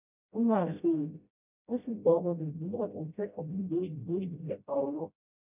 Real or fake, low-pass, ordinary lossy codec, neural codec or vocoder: fake; 3.6 kHz; none; codec, 16 kHz, 0.5 kbps, FreqCodec, smaller model